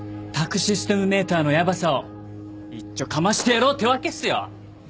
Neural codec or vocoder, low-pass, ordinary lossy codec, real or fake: none; none; none; real